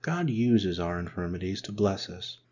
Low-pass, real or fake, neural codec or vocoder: 7.2 kHz; real; none